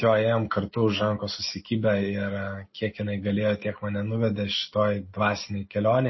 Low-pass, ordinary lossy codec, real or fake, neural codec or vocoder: 7.2 kHz; MP3, 24 kbps; real; none